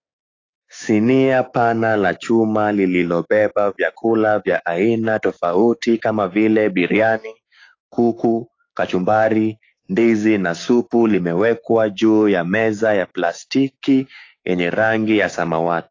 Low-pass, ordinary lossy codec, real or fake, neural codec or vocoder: 7.2 kHz; AAC, 32 kbps; fake; codec, 16 kHz, 6 kbps, DAC